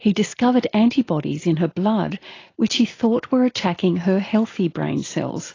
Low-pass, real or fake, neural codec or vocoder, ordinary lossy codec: 7.2 kHz; real; none; AAC, 32 kbps